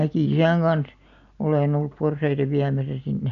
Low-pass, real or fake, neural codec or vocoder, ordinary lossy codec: 7.2 kHz; real; none; none